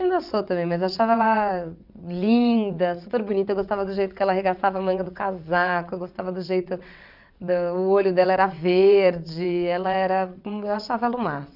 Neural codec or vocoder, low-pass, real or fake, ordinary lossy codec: vocoder, 22.05 kHz, 80 mel bands, WaveNeXt; 5.4 kHz; fake; none